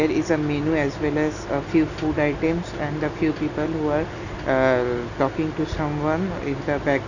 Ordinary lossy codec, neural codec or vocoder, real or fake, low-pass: none; none; real; 7.2 kHz